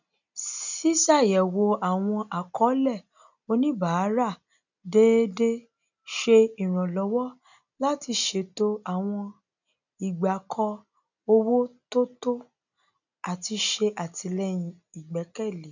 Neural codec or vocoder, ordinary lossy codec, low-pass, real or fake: none; none; 7.2 kHz; real